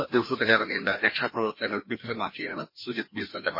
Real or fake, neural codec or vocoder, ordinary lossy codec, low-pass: fake; codec, 16 kHz, 1 kbps, FreqCodec, larger model; MP3, 24 kbps; 5.4 kHz